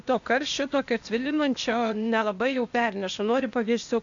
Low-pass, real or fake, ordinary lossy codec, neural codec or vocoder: 7.2 kHz; fake; AAC, 64 kbps; codec, 16 kHz, 0.8 kbps, ZipCodec